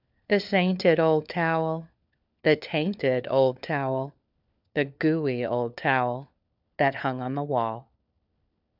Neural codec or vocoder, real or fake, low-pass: codec, 16 kHz, 4 kbps, FunCodec, trained on LibriTTS, 50 frames a second; fake; 5.4 kHz